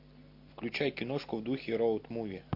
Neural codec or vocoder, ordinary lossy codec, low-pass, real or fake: none; MP3, 32 kbps; 5.4 kHz; real